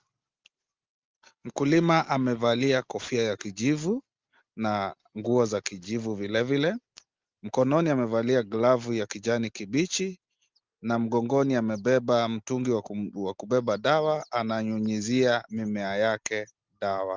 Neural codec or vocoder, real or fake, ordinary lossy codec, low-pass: none; real; Opus, 32 kbps; 7.2 kHz